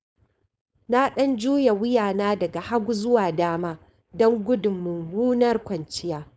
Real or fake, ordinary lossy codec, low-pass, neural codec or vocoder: fake; none; none; codec, 16 kHz, 4.8 kbps, FACodec